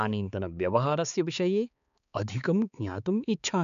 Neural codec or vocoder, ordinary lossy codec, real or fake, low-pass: codec, 16 kHz, 4 kbps, X-Codec, HuBERT features, trained on balanced general audio; none; fake; 7.2 kHz